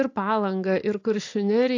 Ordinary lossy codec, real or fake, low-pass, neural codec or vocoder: AAC, 48 kbps; real; 7.2 kHz; none